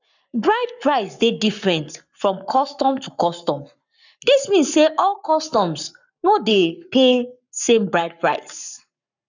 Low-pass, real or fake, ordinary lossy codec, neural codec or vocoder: 7.2 kHz; fake; none; codec, 44.1 kHz, 7.8 kbps, Pupu-Codec